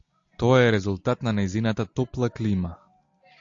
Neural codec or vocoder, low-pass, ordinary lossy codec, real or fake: none; 7.2 kHz; AAC, 64 kbps; real